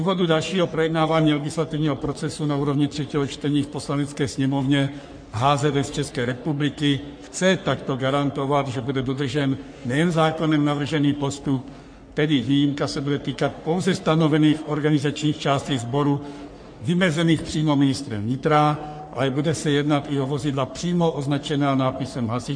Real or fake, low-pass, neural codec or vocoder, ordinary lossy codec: fake; 9.9 kHz; codec, 44.1 kHz, 3.4 kbps, Pupu-Codec; MP3, 48 kbps